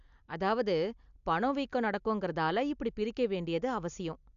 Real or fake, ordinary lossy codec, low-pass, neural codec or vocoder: real; AAC, 96 kbps; 7.2 kHz; none